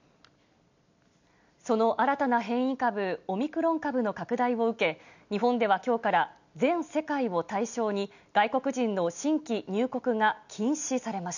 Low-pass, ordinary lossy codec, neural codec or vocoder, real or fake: 7.2 kHz; none; none; real